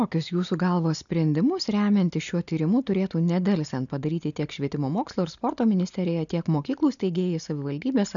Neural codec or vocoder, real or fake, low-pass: none; real; 7.2 kHz